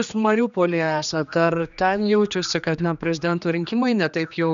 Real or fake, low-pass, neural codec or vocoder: fake; 7.2 kHz; codec, 16 kHz, 2 kbps, X-Codec, HuBERT features, trained on general audio